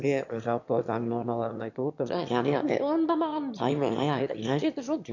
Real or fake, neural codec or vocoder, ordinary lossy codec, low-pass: fake; autoencoder, 22.05 kHz, a latent of 192 numbers a frame, VITS, trained on one speaker; AAC, 48 kbps; 7.2 kHz